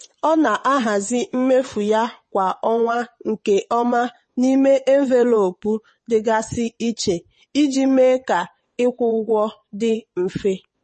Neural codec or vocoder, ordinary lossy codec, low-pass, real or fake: vocoder, 22.05 kHz, 80 mel bands, WaveNeXt; MP3, 32 kbps; 9.9 kHz; fake